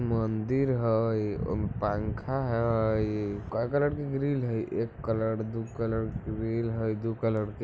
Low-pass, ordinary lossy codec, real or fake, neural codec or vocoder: none; none; real; none